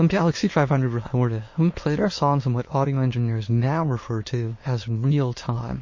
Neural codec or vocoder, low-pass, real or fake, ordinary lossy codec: autoencoder, 22.05 kHz, a latent of 192 numbers a frame, VITS, trained on many speakers; 7.2 kHz; fake; MP3, 32 kbps